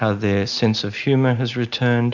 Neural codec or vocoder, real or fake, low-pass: none; real; 7.2 kHz